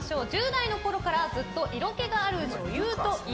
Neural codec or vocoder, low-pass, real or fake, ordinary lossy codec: none; none; real; none